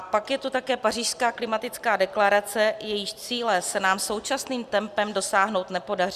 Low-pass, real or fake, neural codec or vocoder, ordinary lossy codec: 14.4 kHz; real; none; Opus, 64 kbps